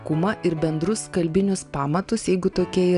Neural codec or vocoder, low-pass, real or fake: none; 10.8 kHz; real